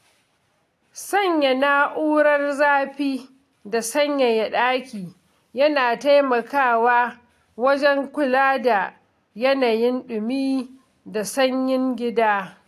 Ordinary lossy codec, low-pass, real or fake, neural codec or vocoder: AAC, 64 kbps; 14.4 kHz; real; none